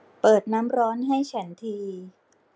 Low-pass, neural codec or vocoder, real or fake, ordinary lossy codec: none; none; real; none